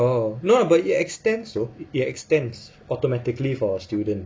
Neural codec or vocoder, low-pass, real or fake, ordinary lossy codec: none; none; real; none